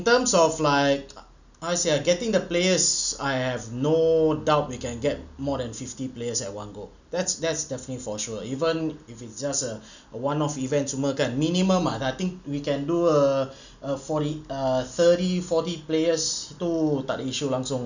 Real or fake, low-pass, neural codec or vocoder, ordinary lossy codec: real; 7.2 kHz; none; none